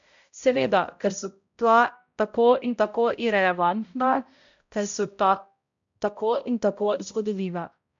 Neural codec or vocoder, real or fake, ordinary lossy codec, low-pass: codec, 16 kHz, 0.5 kbps, X-Codec, HuBERT features, trained on balanced general audio; fake; MP3, 64 kbps; 7.2 kHz